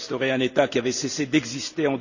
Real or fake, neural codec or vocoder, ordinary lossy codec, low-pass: real; none; none; 7.2 kHz